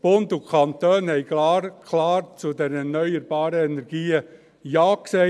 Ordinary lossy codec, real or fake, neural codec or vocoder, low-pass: none; real; none; none